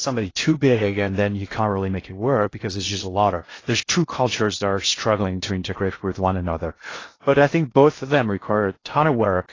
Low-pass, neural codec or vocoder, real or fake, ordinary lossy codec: 7.2 kHz; codec, 16 kHz in and 24 kHz out, 0.6 kbps, FocalCodec, streaming, 2048 codes; fake; AAC, 32 kbps